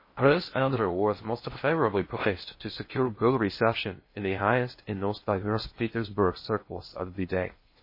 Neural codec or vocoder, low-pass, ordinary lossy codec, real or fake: codec, 16 kHz in and 24 kHz out, 0.6 kbps, FocalCodec, streaming, 2048 codes; 5.4 kHz; MP3, 24 kbps; fake